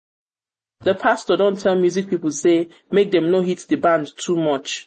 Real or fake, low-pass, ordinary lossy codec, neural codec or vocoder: real; 10.8 kHz; MP3, 32 kbps; none